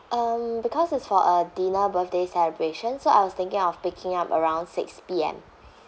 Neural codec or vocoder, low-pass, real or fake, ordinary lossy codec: none; none; real; none